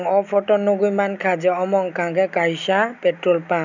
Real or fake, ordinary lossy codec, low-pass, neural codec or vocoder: real; none; 7.2 kHz; none